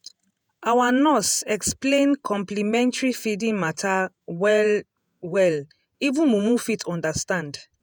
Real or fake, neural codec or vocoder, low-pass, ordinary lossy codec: fake; vocoder, 48 kHz, 128 mel bands, Vocos; none; none